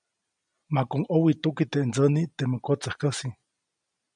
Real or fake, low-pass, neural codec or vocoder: real; 9.9 kHz; none